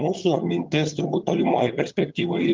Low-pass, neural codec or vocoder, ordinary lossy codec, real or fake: 7.2 kHz; vocoder, 22.05 kHz, 80 mel bands, HiFi-GAN; Opus, 24 kbps; fake